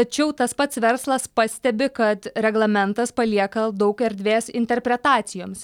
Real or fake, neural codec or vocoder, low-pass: real; none; 19.8 kHz